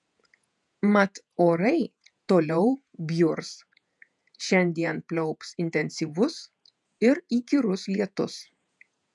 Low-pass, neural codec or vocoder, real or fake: 10.8 kHz; vocoder, 48 kHz, 128 mel bands, Vocos; fake